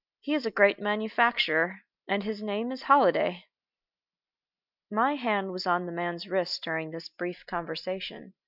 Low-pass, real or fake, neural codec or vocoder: 5.4 kHz; real; none